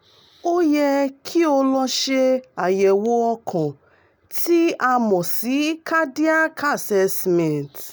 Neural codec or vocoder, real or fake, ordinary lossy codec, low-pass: none; real; none; none